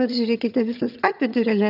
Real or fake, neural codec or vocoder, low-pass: fake; vocoder, 22.05 kHz, 80 mel bands, HiFi-GAN; 5.4 kHz